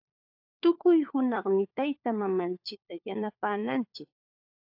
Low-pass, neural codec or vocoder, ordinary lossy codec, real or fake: 5.4 kHz; codec, 16 kHz, 4 kbps, FunCodec, trained on LibriTTS, 50 frames a second; AAC, 48 kbps; fake